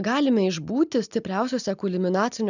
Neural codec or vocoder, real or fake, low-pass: none; real; 7.2 kHz